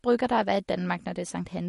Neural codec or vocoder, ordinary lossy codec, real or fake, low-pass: none; MP3, 48 kbps; real; 14.4 kHz